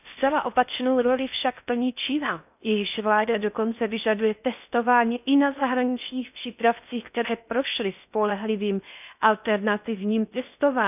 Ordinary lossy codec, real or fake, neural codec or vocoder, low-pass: none; fake; codec, 16 kHz in and 24 kHz out, 0.6 kbps, FocalCodec, streaming, 4096 codes; 3.6 kHz